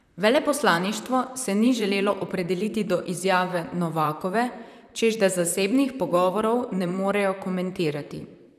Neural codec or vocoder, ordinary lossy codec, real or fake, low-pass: vocoder, 44.1 kHz, 128 mel bands, Pupu-Vocoder; none; fake; 14.4 kHz